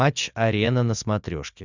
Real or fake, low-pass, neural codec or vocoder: real; 7.2 kHz; none